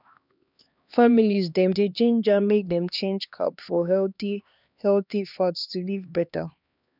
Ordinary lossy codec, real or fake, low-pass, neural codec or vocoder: none; fake; 5.4 kHz; codec, 16 kHz, 2 kbps, X-Codec, HuBERT features, trained on LibriSpeech